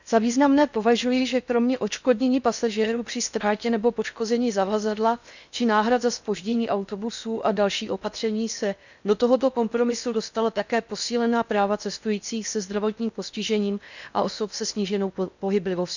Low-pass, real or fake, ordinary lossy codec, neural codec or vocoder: 7.2 kHz; fake; none; codec, 16 kHz in and 24 kHz out, 0.8 kbps, FocalCodec, streaming, 65536 codes